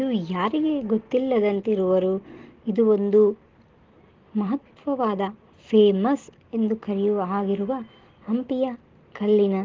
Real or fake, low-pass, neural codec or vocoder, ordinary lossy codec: real; 7.2 kHz; none; Opus, 16 kbps